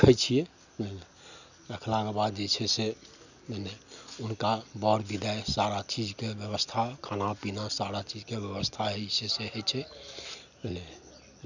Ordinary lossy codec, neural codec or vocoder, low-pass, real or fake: none; none; 7.2 kHz; real